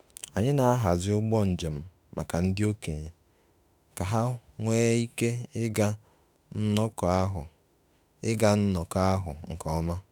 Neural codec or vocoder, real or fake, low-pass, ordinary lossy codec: autoencoder, 48 kHz, 32 numbers a frame, DAC-VAE, trained on Japanese speech; fake; none; none